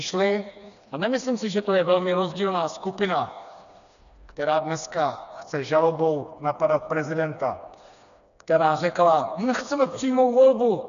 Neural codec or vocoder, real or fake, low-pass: codec, 16 kHz, 2 kbps, FreqCodec, smaller model; fake; 7.2 kHz